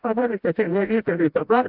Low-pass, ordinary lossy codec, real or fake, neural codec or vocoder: 5.4 kHz; AAC, 48 kbps; fake; codec, 16 kHz, 0.5 kbps, FreqCodec, smaller model